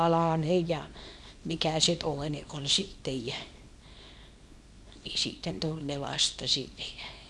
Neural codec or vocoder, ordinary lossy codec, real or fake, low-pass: codec, 24 kHz, 0.9 kbps, WavTokenizer, small release; none; fake; none